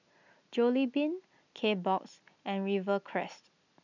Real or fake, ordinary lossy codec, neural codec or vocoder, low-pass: real; none; none; 7.2 kHz